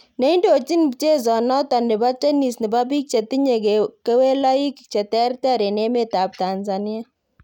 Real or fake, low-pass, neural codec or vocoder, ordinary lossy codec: real; 19.8 kHz; none; none